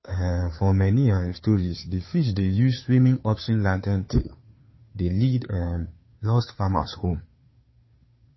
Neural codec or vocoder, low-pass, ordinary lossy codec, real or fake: codec, 16 kHz, 2 kbps, FunCodec, trained on Chinese and English, 25 frames a second; 7.2 kHz; MP3, 24 kbps; fake